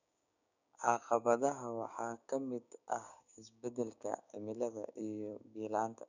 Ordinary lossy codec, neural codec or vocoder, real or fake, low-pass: none; codec, 16 kHz, 6 kbps, DAC; fake; 7.2 kHz